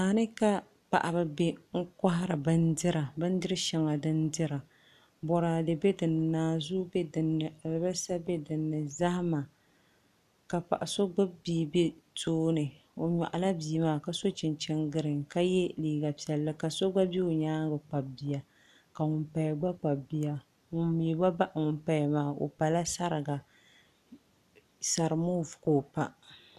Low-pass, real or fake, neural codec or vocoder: 14.4 kHz; real; none